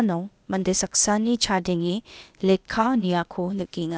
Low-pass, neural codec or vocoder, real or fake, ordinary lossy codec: none; codec, 16 kHz, 0.8 kbps, ZipCodec; fake; none